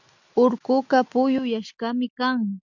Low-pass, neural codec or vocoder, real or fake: 7.2 kHz; none; real